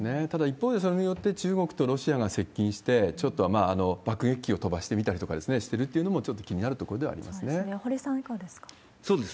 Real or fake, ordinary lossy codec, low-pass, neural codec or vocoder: real; none; none; none